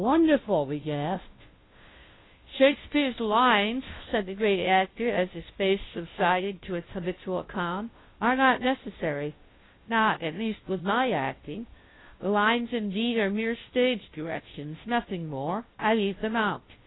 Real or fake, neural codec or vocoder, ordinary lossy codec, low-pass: fake; codec, 16 kHz, 0.5 kbps, FunCodec, trained on Chinese and English, 25 frames a second; AAC, 16 kbps; 7.2 kHz